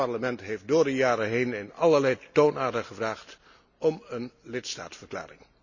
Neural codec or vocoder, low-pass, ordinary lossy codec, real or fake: none; 7.2 kHz; none; real